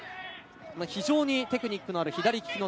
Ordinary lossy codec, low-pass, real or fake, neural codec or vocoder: none; none; real; none